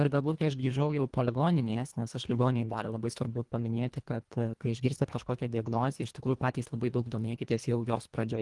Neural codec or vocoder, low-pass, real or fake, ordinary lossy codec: codec, 24 kHz, 1.5 kbps, HILCodec; 10.8 kHz; fake; Opus, 24 kbps